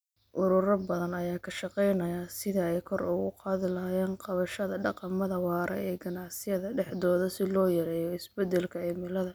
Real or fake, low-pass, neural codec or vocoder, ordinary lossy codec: real; none; none; none